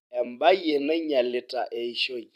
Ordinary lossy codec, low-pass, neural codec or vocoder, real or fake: none; 14.4 kHz; vocoder, 44.1 kHz, 128 mel bands every 256 samples, BigVGAN v2; fake